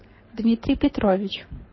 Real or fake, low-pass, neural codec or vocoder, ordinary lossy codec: fake; 7.2 kHz; codec, 24 kHz, 6 kbps, HILCodec; MP3, 24 kbps